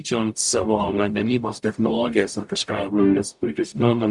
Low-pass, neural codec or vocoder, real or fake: 10.8 kHz; codec, 44.1 kHz, 0.9 kbps, DAC; fake